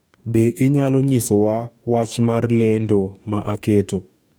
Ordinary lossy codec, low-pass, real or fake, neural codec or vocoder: none; none; fake; codec, 44.1 kHz, 2.6 kbps, DAC